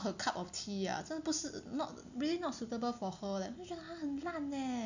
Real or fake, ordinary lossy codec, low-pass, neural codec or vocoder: real; none; 7.2 kHz; none